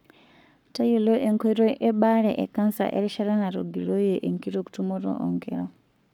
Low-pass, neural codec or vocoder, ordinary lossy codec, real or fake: 19.8 kHz; codec, 44.1 kHz, 7.8 kbps, Pupu-Codec; none; fake